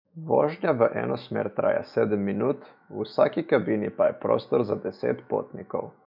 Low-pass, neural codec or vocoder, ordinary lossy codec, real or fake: 5.4 kHz; autoencoder, 48 kHz, 128 numbers a frame, DAC-VAE, trained on Japanese speech; none; fake